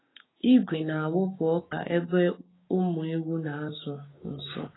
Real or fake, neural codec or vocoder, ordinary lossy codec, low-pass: fake; codec, 16 kHz, 6 kbps, DAC; AAC, 16 kbps; 7.2 kHz